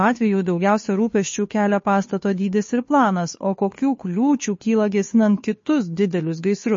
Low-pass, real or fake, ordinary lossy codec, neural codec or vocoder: 7.2 kHz; fake; MP3, 32 kbps; codec, 16 kHz, 2 kbps, FunCodec, trained on Chinese and English, 25 frames a second